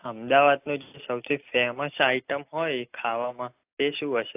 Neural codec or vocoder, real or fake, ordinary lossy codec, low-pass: none; real; AAC, 32 kbps; 3.6 kHz